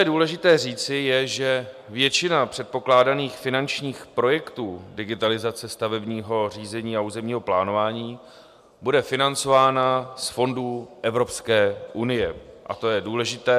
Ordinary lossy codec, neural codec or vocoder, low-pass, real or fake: MP3, 96 kbps; none; 14.4 kHz; real